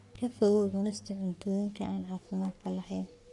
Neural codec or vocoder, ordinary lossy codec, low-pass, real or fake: codec, 44.1 kHz, 3.4 kbps, Pupu-Codec; none; 10.8 kHz; fake